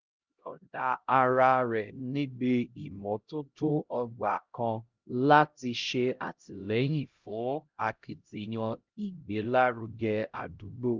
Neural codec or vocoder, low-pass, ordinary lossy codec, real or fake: codec, 16 kHz, 0.5 kbps, X-Codec, HuBERT features, trained on LibriSpeech; 7.2 kHz; Opus, 32 kbps; fake